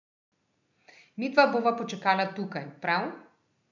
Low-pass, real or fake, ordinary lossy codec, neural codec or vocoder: 7.2 kHz; real; none; none